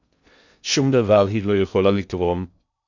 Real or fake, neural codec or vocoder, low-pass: fake; codec, 16 kHz in and 24 kHz out, 0.6 kbps, FocalCodec, streaming, 2048 codes; 7.2 kHz